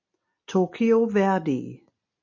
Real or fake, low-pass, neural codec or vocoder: real; 7.2 kHz; none